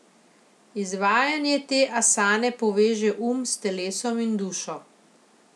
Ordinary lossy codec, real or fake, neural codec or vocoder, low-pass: none; real; none; none